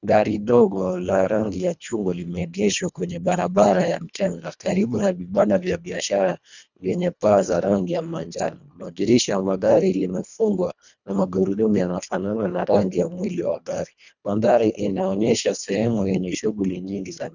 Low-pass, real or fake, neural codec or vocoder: 7.2 kHz; fake; codec, 24 kHz, 1.5 kbps, HILCodec